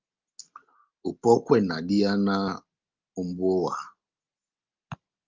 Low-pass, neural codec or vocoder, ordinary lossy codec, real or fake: 7.2 kHz; none; Opus, 32 kbps; real